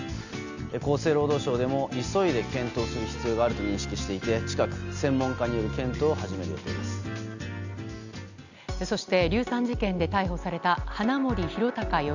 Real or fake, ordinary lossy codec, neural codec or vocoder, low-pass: real; none; none; 7.2 kHz